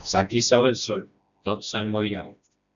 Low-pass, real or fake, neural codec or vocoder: 7.2 kHz; fake; codec, 16 kHz, 1 kbps, FreqCodec, smaller model